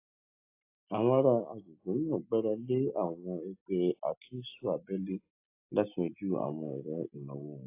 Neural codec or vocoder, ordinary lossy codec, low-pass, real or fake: none; AAC, 32 kbps; 3.6 kHz; real